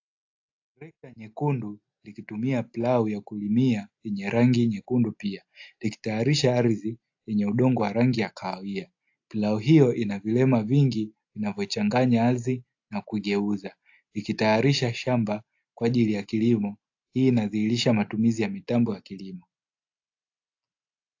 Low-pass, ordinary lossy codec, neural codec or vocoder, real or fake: 7.2 kHz; AAC, 48 kbps; none; real